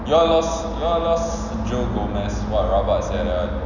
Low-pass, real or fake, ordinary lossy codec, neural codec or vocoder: 7.2 kHz; real; none; none